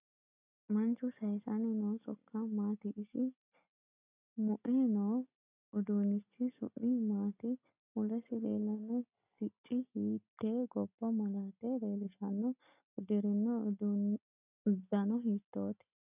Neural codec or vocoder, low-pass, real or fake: none; 3.6 kHz; real